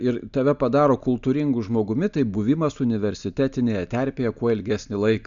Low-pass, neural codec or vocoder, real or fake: 7.2 kHz; none; real